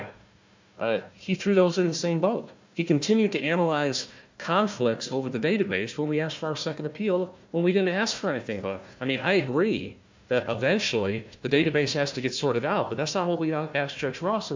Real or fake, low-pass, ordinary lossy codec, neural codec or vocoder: fake; 7.2 kHz; MP3, 64 kbps; codec, 16 kHz, 1 kbps, FunCodec, trained on Chinese and English, 50 frames a second